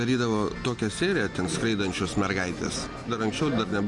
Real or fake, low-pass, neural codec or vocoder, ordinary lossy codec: real; 10.8 kHz; none; MP3, 64 kbps